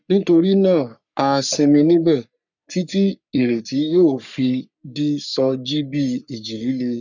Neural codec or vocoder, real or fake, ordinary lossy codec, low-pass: codec, 44.1 kHz, 3.4 kbps, Pupu-Codec; fake; none; 7.2 kHz